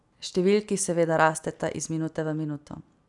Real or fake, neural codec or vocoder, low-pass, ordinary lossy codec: real; none; 10.8 kHz; none